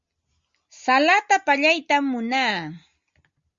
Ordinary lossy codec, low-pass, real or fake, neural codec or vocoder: Opus, 64 kbps; 7.2 kHz; real; none